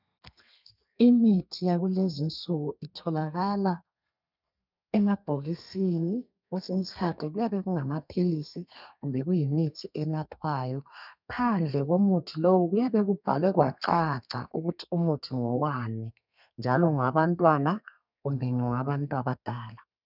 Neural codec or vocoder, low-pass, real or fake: codec, 32 kHz, 1.9 kbps, SNAC; 5.4 kHz; fake